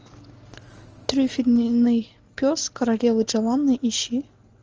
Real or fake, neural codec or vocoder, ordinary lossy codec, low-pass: real; none; Opus, 24 kbps; 7.2 kHz